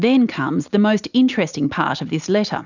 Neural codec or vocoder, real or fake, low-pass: none; real; 7.2 kHz